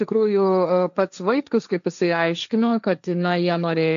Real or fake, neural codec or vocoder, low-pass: fake; codec, 16 kHz, 1.1 kbps, Voila-Tokenizer; 7.2 kHz